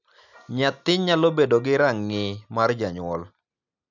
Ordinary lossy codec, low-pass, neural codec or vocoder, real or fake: none; 7.2 kHz; none; real